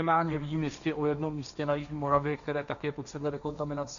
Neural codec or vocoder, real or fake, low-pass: codec, 16 kHz, 1.1 kbps, Voila-Tokenizer; fake; 7.2 kHz